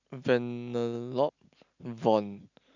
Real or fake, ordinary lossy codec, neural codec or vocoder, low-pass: real; none; none; 7.2 kHz